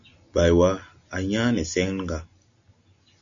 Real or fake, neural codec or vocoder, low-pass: real; none; 7.2 kHz